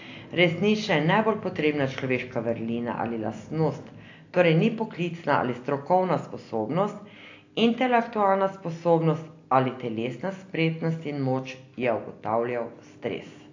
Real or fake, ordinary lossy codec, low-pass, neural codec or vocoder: real; AAC, 48 kbps; 7.2 kHz; none